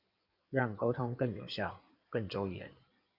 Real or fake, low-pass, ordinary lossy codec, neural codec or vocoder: fake; 5.4 kHz; Opus, 64 kbps; codec, 16 kHz in and 24 kHz out, 2.2 kbps, FireRedTTS-2 codec